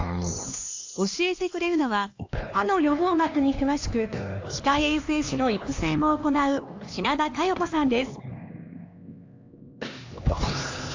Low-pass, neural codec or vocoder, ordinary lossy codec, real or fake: 7.2 kHz; codec, 16 kHz, 2 kbps, X-Codec, HuBERT features, trained on LibriSpeech; AAC, 48 kbps; fake